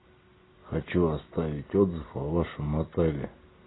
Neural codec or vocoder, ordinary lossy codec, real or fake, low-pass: none; AAC, 16 kbps; real; 7.2 kHz